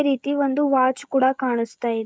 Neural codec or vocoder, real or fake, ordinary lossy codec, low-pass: codec, 16 kHz, 16 kbps, FreqCodec, smaller model; fake; none; none